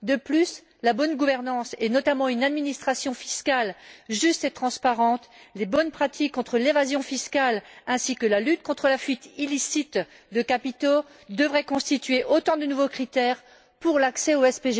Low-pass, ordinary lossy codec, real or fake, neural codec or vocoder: none; none; real; none